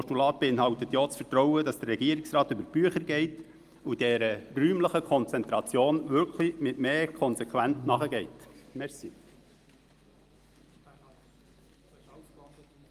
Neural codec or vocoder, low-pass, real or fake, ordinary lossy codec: none; 14.4 kHz; real; Opus, 32 kbps